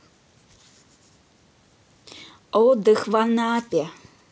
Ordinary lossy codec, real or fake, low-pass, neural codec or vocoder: none; real; none; none